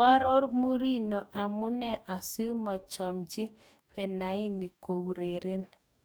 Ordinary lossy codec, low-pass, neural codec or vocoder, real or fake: none; none; codec, 44.1 kHz, 2.6 kbps, DAC; fake